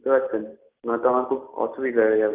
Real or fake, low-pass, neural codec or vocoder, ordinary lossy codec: real; 3.6 kHz; none; Opus, 24 kbps